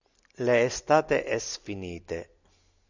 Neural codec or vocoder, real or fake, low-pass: none; real; 7.2 kHz